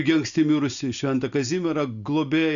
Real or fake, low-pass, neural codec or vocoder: real; 7.2 kHz; none